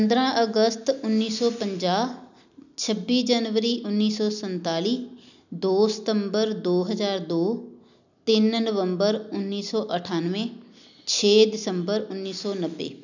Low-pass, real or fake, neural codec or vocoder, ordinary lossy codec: 7.2 kHz; real; none; none